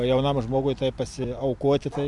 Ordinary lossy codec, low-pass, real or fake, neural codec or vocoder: MP3, 96 kbps; 10.8 kHz; real; none